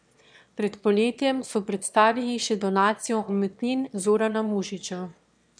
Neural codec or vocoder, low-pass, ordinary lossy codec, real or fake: autoencoder, 22.05 kHz, a latent of 192 numbers a frame, VITS, trained on one speaker; 9.9 kHz; MP3, 96 kbps; fake